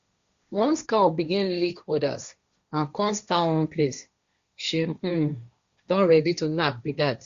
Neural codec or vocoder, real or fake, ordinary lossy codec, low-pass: codec, 16 kHz, 1.1 kbps, Voila-Tokenizer; fake; Opus, 64 kbps; 7.2 kHz